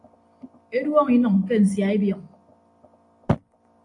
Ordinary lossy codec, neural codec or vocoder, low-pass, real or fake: AAC, 48 kbps; none; 10.8 kHz; real